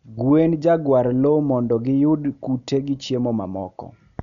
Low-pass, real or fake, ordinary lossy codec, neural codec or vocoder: 7.2 kHz; real; none; none